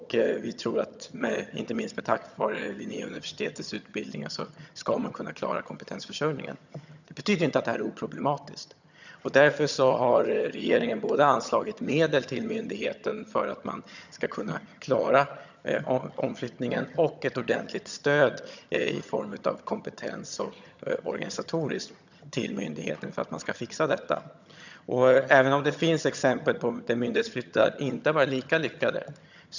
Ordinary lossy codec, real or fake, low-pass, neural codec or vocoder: none; fake; 7.2 kHz; vocoder, 22.05 kHz, 80 mel bands, HiFi-GAN